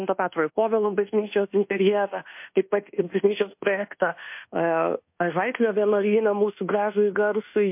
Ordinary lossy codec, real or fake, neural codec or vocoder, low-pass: MP3, 32 kbps; fake; codec, 24 kHz, 1.2 kbps, DualCodec; 3.6 kHz